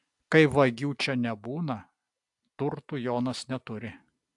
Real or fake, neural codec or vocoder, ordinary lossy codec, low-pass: real; none; AAC, 64 kbps; 10.8 kHz